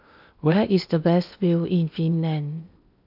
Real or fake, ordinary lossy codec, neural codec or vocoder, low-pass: fake; none; codec, 16 kHz in and 24 kHz out, 0.8 kbps, FocalCodec, streaming, 65536 codes; 5.4 kHz